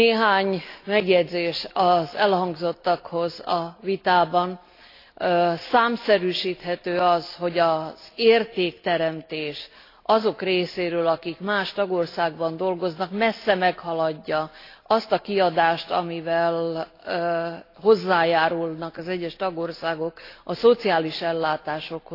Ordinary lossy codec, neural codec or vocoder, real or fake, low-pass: AAC, 32 kbps; none; real; 5.4 kHz